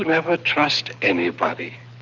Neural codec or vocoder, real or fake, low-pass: codec, 24 kHz, 6 kbps, HILCodec; fake; 7.2 kHz